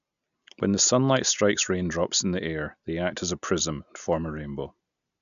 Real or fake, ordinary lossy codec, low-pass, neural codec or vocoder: real; none; 7.2 kHz; none